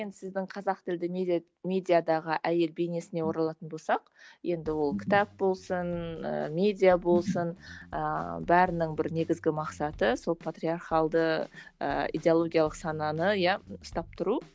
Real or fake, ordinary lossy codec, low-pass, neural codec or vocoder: real; none; none; none